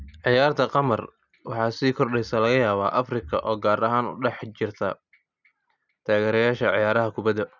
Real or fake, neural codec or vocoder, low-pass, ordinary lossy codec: real; none; 7.2 kHz; none